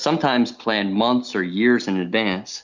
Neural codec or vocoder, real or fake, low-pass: none; real; 7.2 kHz